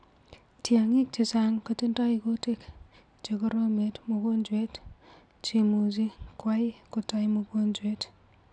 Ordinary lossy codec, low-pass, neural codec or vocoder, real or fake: none; 9.9 kHz; none; real